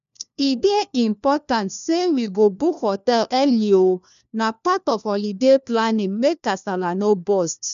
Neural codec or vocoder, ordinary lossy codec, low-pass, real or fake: codec, 16 kHz, 1 kbps, FunCodec, trained on LibriTTS, 50 frames a second; none; 7.2 kHz; fake